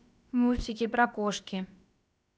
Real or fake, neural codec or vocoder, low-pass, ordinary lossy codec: fake; codec, 16 kHz, about 1 kbps, DyCAST, with the encoder's durations; none; none